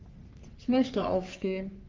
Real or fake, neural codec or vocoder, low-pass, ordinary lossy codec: fake; codec, 44.1 kHz, 3.4 kbps, Pupu-Codec; 7.2 kHz; Opus, 24 kbps